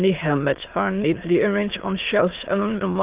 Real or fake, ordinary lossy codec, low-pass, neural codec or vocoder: fake; Opus, 32 kbps; 3.6 kHz; autoencoder, 22.05 kHz, a latent of 192 numbers a frame, VITS, trained on many speakers